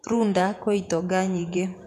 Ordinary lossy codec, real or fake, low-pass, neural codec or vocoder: none; fake; 14.4 kHz; vocoder, 48 kHz, 128 mel bands, Vocos